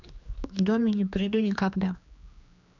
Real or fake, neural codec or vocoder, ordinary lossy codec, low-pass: fake; codec, 16 kHz, 2 kbps, X-Codec, HuBERT features, trained on general audio; none; 7.2 kHz